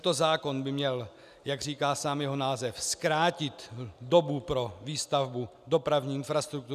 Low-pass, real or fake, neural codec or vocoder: 14.4 kHz; real; none